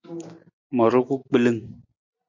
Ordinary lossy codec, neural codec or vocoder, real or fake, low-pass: MP3, 64 kbps; none; real; 7.2 kHz